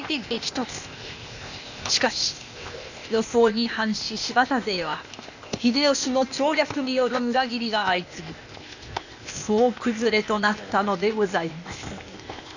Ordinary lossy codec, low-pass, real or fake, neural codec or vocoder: none; 7.2 kHz; fake; codec, 16 kHz, 0.8 kbps, ZipCodec